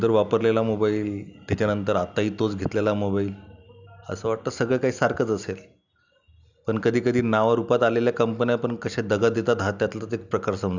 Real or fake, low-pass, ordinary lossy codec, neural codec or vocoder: real; 7.2 kHz; none; none